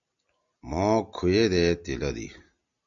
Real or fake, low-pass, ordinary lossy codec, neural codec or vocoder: real; 7.2 kHz; MP3, 64 kbps; none